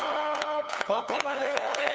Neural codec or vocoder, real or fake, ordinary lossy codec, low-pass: codec, 16 kHz, 8 kbps, FunCodec, trained on LibriTTS, 25 frames a second; fake; none; none